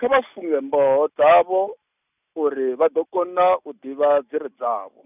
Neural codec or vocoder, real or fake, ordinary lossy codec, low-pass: none; real; none; 3.6 kHz